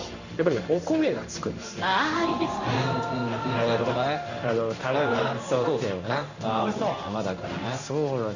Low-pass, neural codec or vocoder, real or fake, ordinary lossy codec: 7.2 kHz; codec, 16 kHz in and 24 kHz out, 1 kbps, XY-Tokenizer; fake; Opus, 64 kbps